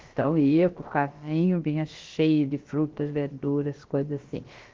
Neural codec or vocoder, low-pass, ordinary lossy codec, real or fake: codec, 16 kHz, about 1 kbps, DyCAST, with the encoder's durations; 7.2 kHz; Opus, 16 kbps; fake